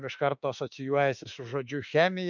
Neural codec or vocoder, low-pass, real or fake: autoencoder, 48 kHz, 32 numbers a frame, DAC-VAE, trained on Japanese speech; 7.2 kHz; fake